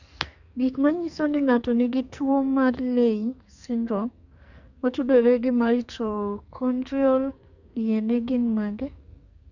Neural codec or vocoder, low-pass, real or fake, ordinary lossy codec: codec, 44.1 kHz, 2.6 kbps, SNAC; 7.2 kHz; fake; none